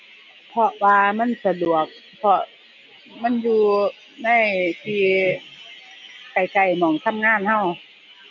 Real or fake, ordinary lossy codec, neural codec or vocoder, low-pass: real; none; none; 7.2 kHz